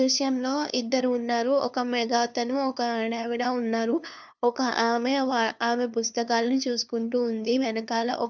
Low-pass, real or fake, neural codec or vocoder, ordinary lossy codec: none; fake; codec, 16 kHz, 2 kbps, FunCodec, trained on LibriTTS, 25 frames a second; none